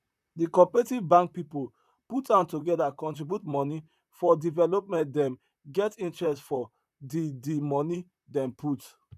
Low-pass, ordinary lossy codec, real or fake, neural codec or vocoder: 14.4 kHz; none; fake; vocoder, 44.1 kHz, 128 mel bands, Pupu-Vocoder